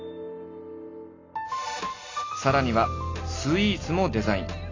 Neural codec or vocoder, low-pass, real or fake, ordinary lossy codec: none; 7.2 kHz; real; AAC, 32 kbps